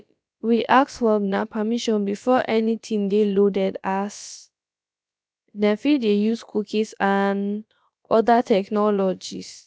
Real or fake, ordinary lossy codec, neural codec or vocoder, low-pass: fake; none; codec, 16 kHz, about 1 kbps, DyCAST, with the encoder's durations; none